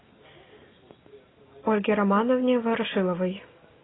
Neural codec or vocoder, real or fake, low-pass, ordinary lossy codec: none; real; 7.2 kHz; AAC, 16 kbps